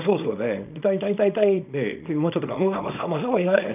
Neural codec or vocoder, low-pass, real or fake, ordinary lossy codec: codec, 24 kHz, 0.9 kbps, WavTokenizer, small release; 3.6 kHz; fake; none